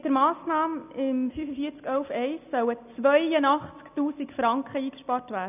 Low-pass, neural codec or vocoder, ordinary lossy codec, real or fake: 3.6 kHz; none; none; real